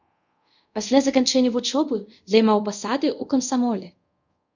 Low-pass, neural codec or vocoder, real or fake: 7.2 kHz; codec, 24 kHz, 0.5 kbps, DualCodec; fake